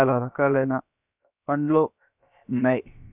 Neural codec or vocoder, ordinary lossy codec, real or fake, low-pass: codec, 16 kHz, about 1 kbps, DyCAST, with the encoder's durations; none; fake; 3.6 kHz